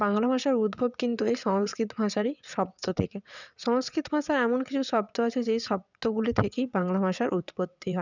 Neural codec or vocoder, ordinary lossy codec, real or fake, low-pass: vocoder, 22.05 kHz, 80 mel bands, WaveNeXt; none; fake; 7.2 kHz